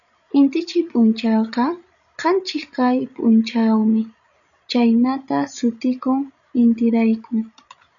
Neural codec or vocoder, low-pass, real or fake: codec, 16 kHz, 16 kbps, FreqCodec, larger model; 7.2 kHz; fake